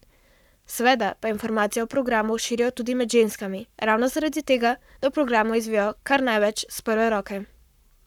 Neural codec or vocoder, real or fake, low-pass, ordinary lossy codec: codec, 44.1 kHz, 7.8 kbps, Pupu-Codec; fake; 19.8 kHz; none